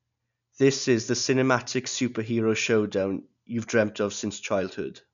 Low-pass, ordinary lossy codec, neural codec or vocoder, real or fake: 7.2 kHz; none; none; real